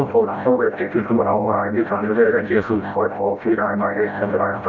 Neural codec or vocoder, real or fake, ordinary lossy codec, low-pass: codec, 16 kHz, 0.5 kbps, FreqCodec, smaller model; fake; none; 7.2 kHz